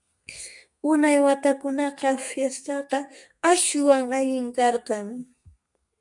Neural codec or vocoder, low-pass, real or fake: codec, 32 kHz, 1.9 kbps, SNAC; 10.8 kHz; fake